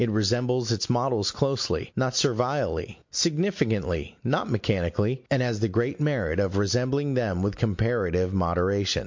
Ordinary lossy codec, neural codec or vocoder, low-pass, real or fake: MP3, 48 kbps; none; 7.2 kHz; real